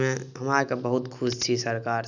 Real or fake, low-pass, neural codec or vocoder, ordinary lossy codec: real; 7.2 kHz; none; none